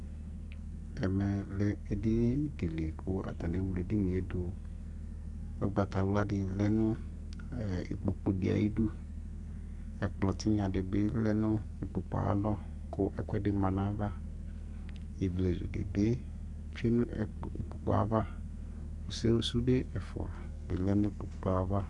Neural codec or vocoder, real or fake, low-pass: codec, 44.1 kHz, 2.6 kbps, SNAC; fake; 10.8 kHz